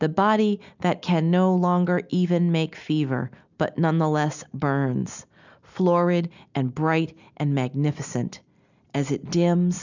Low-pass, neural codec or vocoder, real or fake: 7.2 kHz; none; real